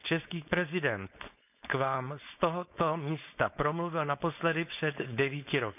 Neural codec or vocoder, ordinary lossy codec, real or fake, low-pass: codec, 16 kHz, 4.8 kbps, FACodec; none; fake; 3.6 kHz